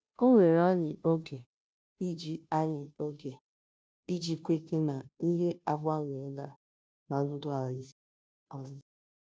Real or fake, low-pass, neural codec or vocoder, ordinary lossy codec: fake; none; codec, 16 kHz, 0.5 kbps, FunCodec, trained on Chinese and English, 25 frames a second; none